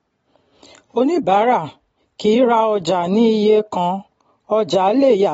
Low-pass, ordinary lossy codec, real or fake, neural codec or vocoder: 19.8 kHz; AAC, 24 kbps; real; none